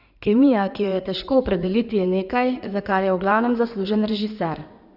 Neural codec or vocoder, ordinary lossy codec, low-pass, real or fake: codec, 16 kHz in and 24 kHz out, 2.2 kbps, FireRedTTS-2 codec; none; 5.4 kHz; fake